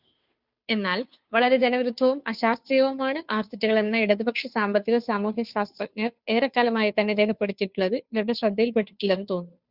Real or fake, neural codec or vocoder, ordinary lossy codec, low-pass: fake; codec, 16 kHz, 2 kbps, FunCodec, trained on Chinese and English, 25 frames a second; Opus, 64 kbps; 5.4 kHz